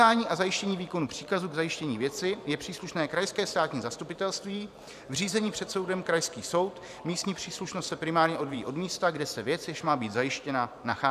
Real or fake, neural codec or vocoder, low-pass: fake; vocoder, 44.1 kHz, 128 mel bands every 512 samples, BigVGAN v2; 14.4 kHz